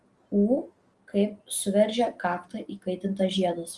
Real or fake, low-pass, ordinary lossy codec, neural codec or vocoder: real; 10.8 kHz; Opus, 24 kbps; none